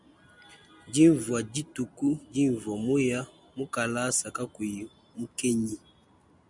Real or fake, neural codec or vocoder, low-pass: real; none; 10.8 kHz